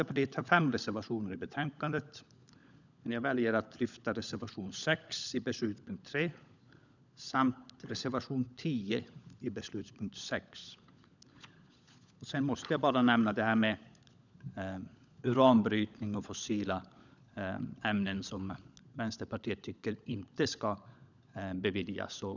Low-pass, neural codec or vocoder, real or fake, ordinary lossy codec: 7.2 kHz; codec, 16 kHz, 16 kbps, FunCodec, trained on LibriTTS, 50 frames a second; fake; none